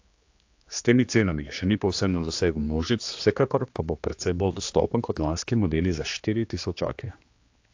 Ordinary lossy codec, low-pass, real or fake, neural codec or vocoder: AAC, 48 kbps; 7.2 kHz; fake; codec, 16 kHz, 2 kbps, X-Codec, HuBERT features, trained on general audio